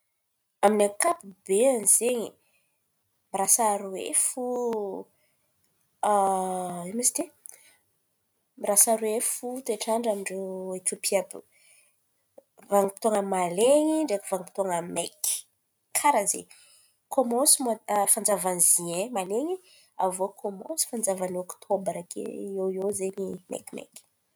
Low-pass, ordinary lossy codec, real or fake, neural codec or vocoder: none; none; real; none